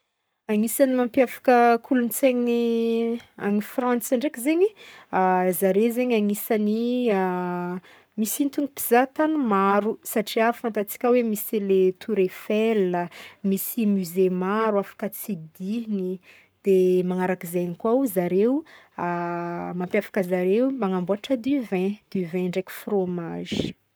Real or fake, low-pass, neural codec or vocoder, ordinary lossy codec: fake; none; codec, 44.1 kHz, 7.8 kbps, Pupu-Codec; none